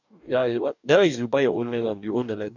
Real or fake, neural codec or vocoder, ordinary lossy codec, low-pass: fake; codec, 44.1 kHz, 2.6 kbps, DAC; none; 7.2 kHz